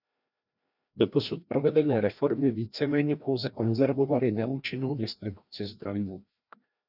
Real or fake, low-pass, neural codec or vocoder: fake; 5.4 kHz; codec, 16 kHz, 1 kbps, FreqCodec, larger model